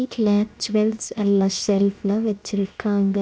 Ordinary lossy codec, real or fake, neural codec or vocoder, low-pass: none; fake; codec, 16 kHz, 0.7 kbps, FocalCodec; none